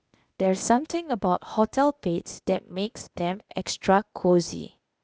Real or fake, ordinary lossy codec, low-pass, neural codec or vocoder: fake; none; none; codec, 16 kHz, 0.8 kbps, ZipCodec